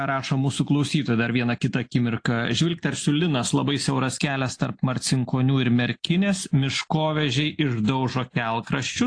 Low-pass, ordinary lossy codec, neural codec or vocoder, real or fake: 9.9 kHz; AAC, 48 kbps; none; real